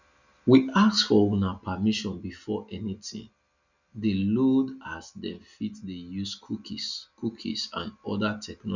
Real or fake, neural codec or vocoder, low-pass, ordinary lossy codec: real; none; 7.2 kHz; none